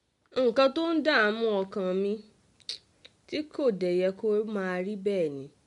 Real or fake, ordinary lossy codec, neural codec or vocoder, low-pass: real; MP3, 64 kbps; none; 10.8 kHz